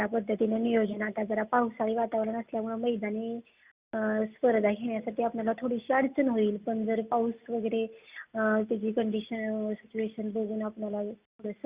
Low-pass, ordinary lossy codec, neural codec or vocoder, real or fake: 3.6 kHz; Opus, 64 kbps; none; real